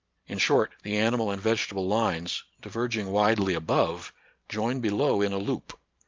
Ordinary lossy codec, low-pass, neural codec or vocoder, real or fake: Opus, 32 kbps; 7.2 kHz; vocoder, 44.1 kHz, 128 mel bands every 512 samples, BigVGAN v2; fake